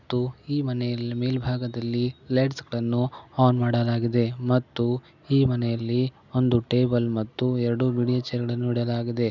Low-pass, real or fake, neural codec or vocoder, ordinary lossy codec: 7.2 kHz; real; none; none